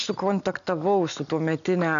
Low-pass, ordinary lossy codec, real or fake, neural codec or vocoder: 7.2 kHz; MP3, 96 kbps; real; none